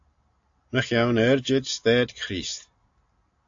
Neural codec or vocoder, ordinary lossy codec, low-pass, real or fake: none; AAC, 64 kbps; 7.2 kHz; real